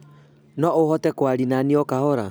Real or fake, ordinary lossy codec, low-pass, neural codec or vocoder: real; none; none; none